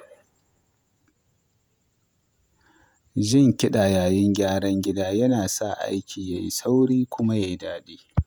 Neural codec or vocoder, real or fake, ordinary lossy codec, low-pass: vocoder, 48 kHz, 128 mel bands, Vocos; fake; none; none